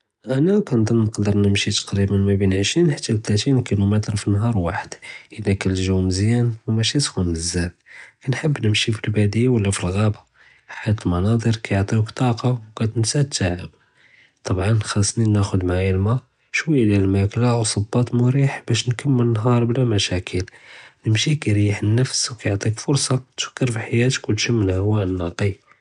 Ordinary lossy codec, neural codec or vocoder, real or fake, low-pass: none; none; real; 10.8 kHz